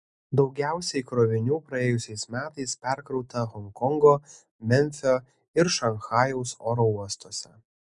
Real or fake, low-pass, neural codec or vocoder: real; 10.8 kHz; none